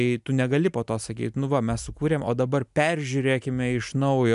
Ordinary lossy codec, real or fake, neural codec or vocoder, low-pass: MP3, 96 kbps; real; none; 10.8 kHz